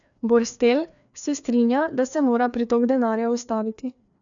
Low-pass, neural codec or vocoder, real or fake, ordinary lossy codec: 7.2 kHz; codec, 16 kHz, 2 kbps, FreqCodec, larger model; fake; none